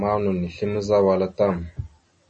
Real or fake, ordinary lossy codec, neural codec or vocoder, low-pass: real; MP3, 32 kbps; none; 10.8 kHz